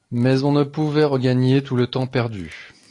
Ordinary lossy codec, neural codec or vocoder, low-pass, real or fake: AAC, 48 kbps; none; 10.8 kHz; real